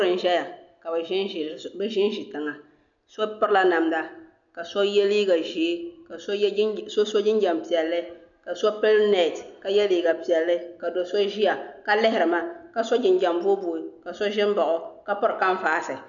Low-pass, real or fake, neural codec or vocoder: 7.2 kHz; real; none